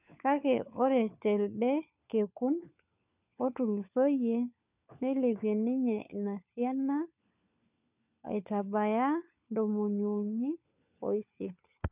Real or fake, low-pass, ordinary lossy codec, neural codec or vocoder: fake; 3.6 kHz; none; codec, 16 kHz, 4 kbps, FunCodec, trained on LibriTTS, 50 frames a second